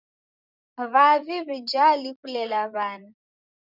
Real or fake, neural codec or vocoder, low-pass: fake; vocoder, 44.1 kHz, 128 mel bands, Pupu-Vocoder; 5.4 kHz